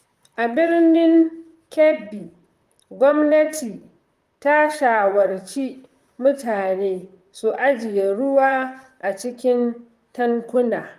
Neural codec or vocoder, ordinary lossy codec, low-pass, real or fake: vocoder, 44.1 kHz, 128 mel bands, Pupu-Vocoder; Opus, 32 kbps; 14.4 kHz; fake